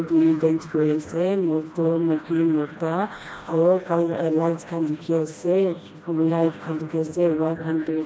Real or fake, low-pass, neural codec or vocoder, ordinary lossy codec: fake; none; codec, 16 kHz, 1 kbps, FreqCodec, smaller model; none